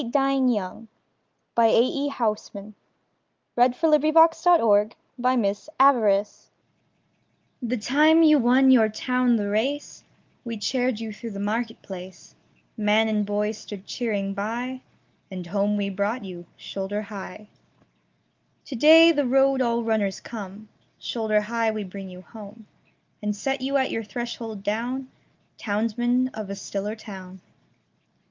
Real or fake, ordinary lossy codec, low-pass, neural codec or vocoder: real; Opus, 32 kbps; 7.2 kHz; none